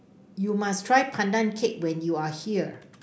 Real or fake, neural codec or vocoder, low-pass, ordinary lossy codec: real; none; none; none